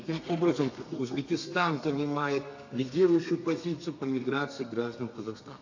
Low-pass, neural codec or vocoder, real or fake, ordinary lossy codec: 7.2 kHz; codec, 32 kHz, 1.9 kbps, SNAC; fake; AAC, 48 kbps